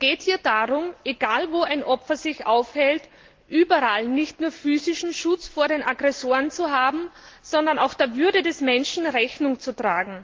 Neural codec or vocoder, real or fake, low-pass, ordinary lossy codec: none; real; 7.2 kHz; Opus, 16 kbps